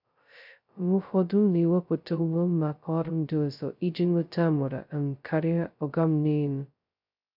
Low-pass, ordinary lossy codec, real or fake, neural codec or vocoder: 5.4 kHz; none; fake; codec, 16 kHz, 0.2 kbps, FocalCodec